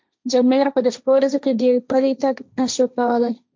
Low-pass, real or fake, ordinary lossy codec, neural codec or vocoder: 7.2 kHz; fake; MP3, 64 kbps; codec, 16 kHz, 1.1 kbps, Voila-Tokenizer